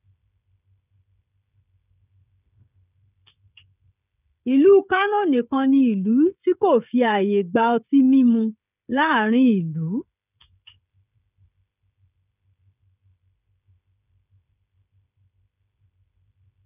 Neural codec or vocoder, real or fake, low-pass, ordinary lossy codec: codec, 16 kHz, 16 kbps, FreqCodec, smaller model; fake; 3.6 kHz; none